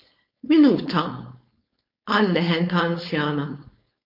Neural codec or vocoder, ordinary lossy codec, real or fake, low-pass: codec, 16 kHz, 4.8 kbps, FACodec; MP3, 32 kbps; fake; 5.4 kHz